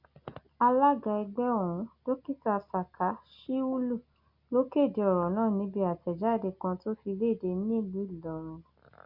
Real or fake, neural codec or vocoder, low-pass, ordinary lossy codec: real; none; 5.4 kHz; Opus, 64 kbps